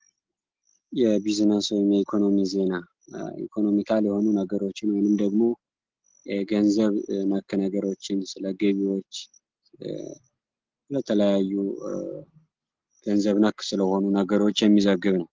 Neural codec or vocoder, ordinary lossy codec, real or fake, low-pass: none; Opus, 16 kbps; real; 7.2 kHz